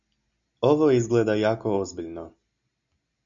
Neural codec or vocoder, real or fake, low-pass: none; real; 7.2 kHz